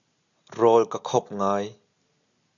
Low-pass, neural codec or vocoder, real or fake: 7.2 kHz; none; real